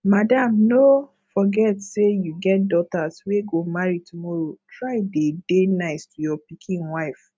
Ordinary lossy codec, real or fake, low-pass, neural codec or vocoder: none; real; none; none